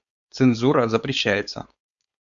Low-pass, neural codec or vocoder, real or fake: 7.2 kHz; codec, 16 kHz, 4.8 kbps, FACodec; fake